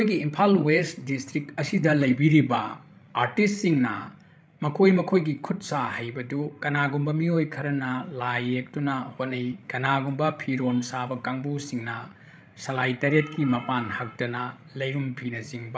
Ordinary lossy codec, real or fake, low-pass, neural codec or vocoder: none; fake; none; codec, 16 kHz, 16 kbps, FreqCodec, larger model